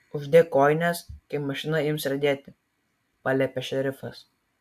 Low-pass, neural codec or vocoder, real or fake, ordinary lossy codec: 14.4 kHz; none; real; AAC, 96 kbps